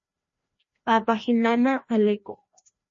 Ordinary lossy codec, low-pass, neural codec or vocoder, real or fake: MP3, 32 kbps; 7.2 kHz; codec, 16 kHz, 1 kbps, FreqCodec, larger model; fake